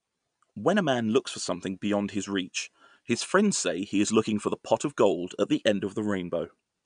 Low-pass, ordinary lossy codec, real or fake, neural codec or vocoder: 9.9 kHz; none; real; none